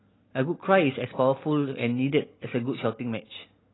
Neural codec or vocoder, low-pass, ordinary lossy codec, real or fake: none; 7.2 kHz; AAC, 16 kbps; real